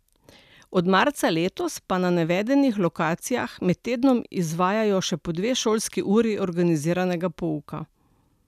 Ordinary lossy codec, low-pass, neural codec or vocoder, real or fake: none; 14.4 kHz; none; real